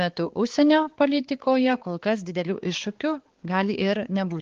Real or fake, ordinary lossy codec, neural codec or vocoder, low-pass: fake; Opus, 16 kbps; codec, 16 kHz, 4 kbps, X-Codec, HuBERT features, trained on balanced general audio; 7.2 kHz